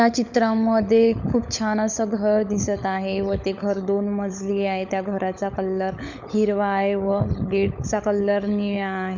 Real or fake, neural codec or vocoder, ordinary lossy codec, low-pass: fake; codec, 16 kHz, 16 kbps, FunCodec, trained on LibriTTS, 50 frames a second; none; 7.2 kHz